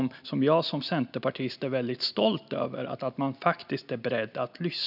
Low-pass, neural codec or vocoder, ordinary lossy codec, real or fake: 5.4 kHz; none; none; real